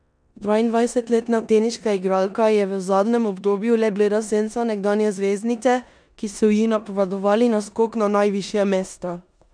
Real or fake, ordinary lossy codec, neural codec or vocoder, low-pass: fake; none; codec, 16 kHz in and 24 kHz out, 0.9 kbps, LongCat-Audio-Codec, four codebook decoder; 9.9 kHz